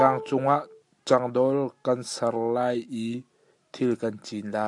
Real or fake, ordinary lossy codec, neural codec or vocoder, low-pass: real; AAC, 64 kbps; none; 10.8 kHz